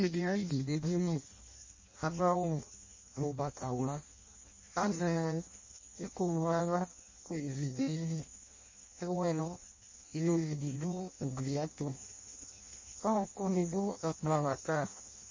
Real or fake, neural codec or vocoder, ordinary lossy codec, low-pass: fake; codec, 16 kHz in and 24 kHz out, 0.6 kbps, FireRedTTS-2 codec; MP3, 32 kbps; 7.2 kHz